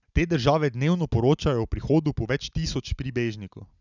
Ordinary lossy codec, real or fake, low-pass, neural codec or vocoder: none; real; 7.2 kHz; none